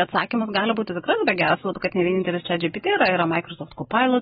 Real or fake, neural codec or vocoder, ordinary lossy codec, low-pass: real; none; AAC, 16 kbps; 19.8 kHz